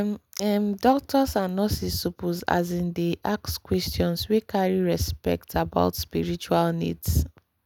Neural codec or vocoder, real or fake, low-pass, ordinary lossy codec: none; real; 19.8 kHz; none